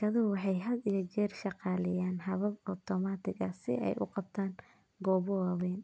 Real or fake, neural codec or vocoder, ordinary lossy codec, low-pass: real; none; none; none